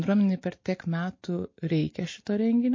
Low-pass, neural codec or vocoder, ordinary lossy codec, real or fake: 7.2 kHz; none; MP3, 32 kbps; real